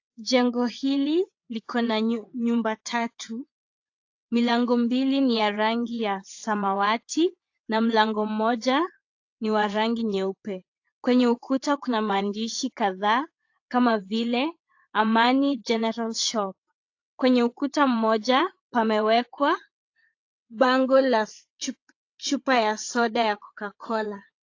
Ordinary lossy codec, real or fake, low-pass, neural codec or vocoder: AAC, 48 kbps; fake; 7.2 kHz; vocoder, 22.05 kHz, 80 mel bands, WaveNeXt